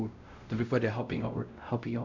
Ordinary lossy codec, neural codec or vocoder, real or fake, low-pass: none; codec, 16 kHz, 0.5 kbps, X-Codec, WavLM features, trained on Multilingual LibriSpeech; fake; 7.2 kHz